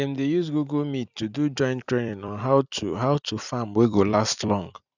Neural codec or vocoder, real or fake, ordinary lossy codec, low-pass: vocoder, 44.1 kHz, 128 mel bands every 256 samples, BigVGAN v2; fake; none; 7.2 kHz